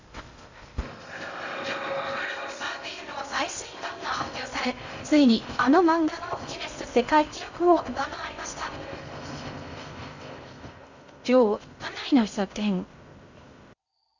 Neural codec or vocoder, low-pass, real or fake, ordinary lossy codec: codec, 16 kHz in and 24 kHz out, 0.6 kbps, FocalCodec, streaming, 2048 codes; 7.2 kHz; fake; Opus, 64 kbps